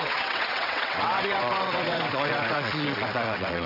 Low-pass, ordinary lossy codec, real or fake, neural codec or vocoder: 5.4 kHz; none; fake; vocoder, 22.05 kHz, 80 mel bands, WaveNeXt